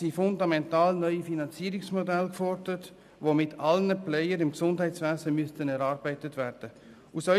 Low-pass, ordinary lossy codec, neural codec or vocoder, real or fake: 14.4 kHz; none; none; real